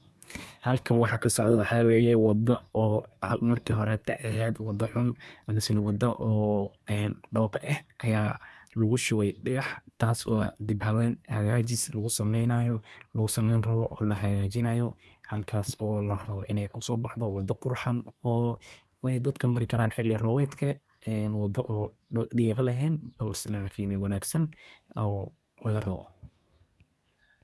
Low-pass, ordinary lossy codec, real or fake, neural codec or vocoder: none; none; fake; codec, 24 kHz, 1 kbps, SNAC